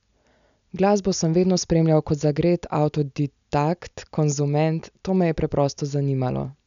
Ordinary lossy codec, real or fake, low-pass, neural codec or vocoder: none; real; 7.2 kHz; none